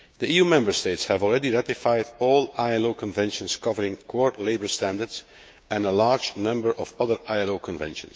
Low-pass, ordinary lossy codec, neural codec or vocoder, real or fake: none; none; codec, 16 kHz, 6 kbps, DAC; fake